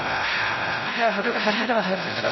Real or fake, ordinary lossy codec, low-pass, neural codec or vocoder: fake; MP3, 24 kbps; 7.2 kHz; codec, 16 kHz, 0.5 kbps, X-Codec, WavLM features, trained on Multilingual LibriSpeech